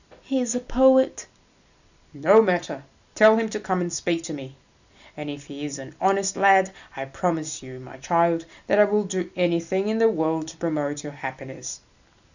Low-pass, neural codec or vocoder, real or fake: 7.2 kHz; none; real